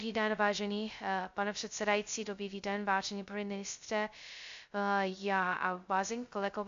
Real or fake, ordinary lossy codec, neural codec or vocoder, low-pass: fake; AAC, 64 kbps; codec, 16 kHz, 0.2 kbps, FocalCodec; 7.2 kHz